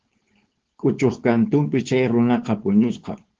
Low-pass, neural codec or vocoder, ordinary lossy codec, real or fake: 7.2 kHz; codec, 16 kHz, 4.8 kbps, FACodec; Opus, 16 kbps; fake